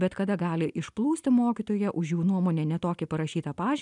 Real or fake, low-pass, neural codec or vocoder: real; 10.8 kHz; none